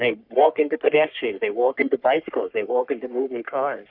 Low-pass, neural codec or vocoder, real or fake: 5.4 kHz; codec, 44.1 kHz, 3.4 kbps, Pupu-Codec; fake